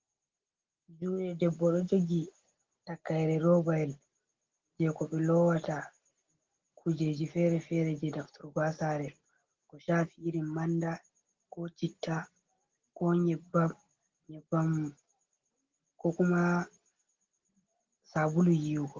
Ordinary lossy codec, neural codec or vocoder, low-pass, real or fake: Opus, 16 kbps; none; 7.2 kHz; real